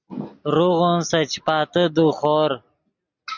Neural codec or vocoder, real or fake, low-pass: none; real; 7.2 kHz